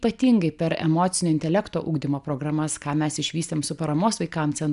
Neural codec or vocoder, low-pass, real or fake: none; 10.8 kHz; real